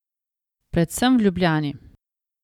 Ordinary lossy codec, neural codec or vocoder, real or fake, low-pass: none; none; real; 19.8 kHz